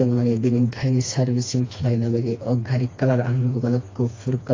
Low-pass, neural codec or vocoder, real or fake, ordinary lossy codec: 7.2 kHz; codec, 16 kHz, 2 kbps, FreqCodec, smaller model; fake; AAC, 32 kbps